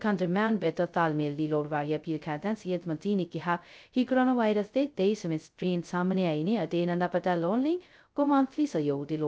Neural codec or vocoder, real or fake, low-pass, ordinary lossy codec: codec, 16 kHz, 0.2 kbps, FocalCodec; fake; none; none